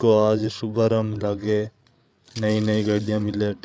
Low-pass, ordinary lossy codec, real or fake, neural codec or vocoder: none; none; fake; codec, 16 kHz, 8 kbps, FreqCodec, larger model